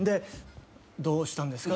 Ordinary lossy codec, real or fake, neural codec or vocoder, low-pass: none; real; none; none